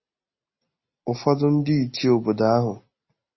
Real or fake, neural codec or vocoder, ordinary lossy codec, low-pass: real; none; MP3, 24 kbps; 7.2 kHz